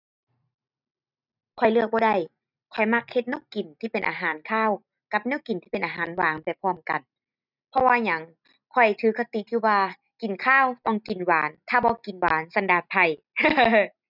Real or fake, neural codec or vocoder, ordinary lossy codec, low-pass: real; none; none; 5.4 kHz